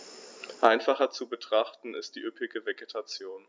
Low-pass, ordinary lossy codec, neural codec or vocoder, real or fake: none; none; none; real